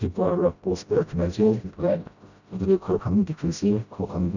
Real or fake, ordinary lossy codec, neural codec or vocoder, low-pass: fake; none; codec, 16 kHz, 0.5 kbps, FreqCodec, smaller model; 7.2 kHz